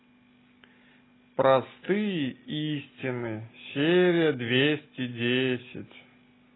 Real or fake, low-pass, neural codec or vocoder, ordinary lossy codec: real; 7.2 kHz; none; AAC, 16 kbps